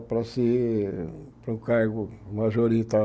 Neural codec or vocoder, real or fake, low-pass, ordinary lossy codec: none; real; none; none